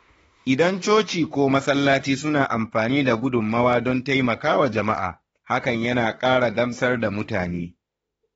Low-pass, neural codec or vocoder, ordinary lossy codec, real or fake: 19.8 kHz; autoencoder, 48 kHz, 32 numbers a frame, DAC-VAE, trained on Japanese speech; AAC, 24 kbps; fake